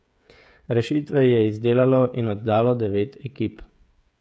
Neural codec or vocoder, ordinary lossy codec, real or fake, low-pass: codec, 16 kHz, 16 kbps, FreqCodec, smaller model; none; fake; none